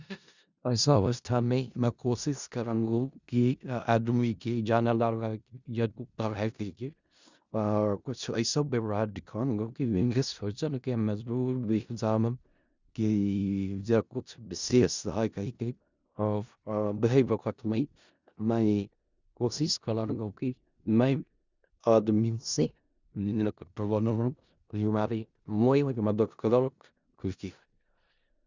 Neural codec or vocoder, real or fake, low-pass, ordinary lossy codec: codec, 16 kHz in and 24 kHz out, 0.4 kbps, LongCat-Audio-Codec, four codebook decoder; fake; 7.2 kHz; Opus, 64 kbps